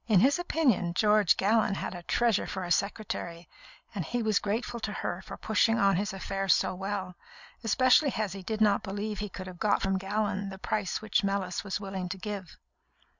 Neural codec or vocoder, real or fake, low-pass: none; real; 7.2 kHz